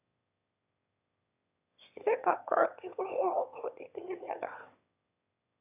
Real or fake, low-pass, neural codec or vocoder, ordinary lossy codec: fake; 3.6 kHz; autoencoder, 22.05 kHz, a latent of 192 numbers a frame, VITS, trained on one speaker; none